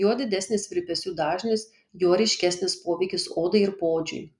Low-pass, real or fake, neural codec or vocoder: 10.8 kHz; real; none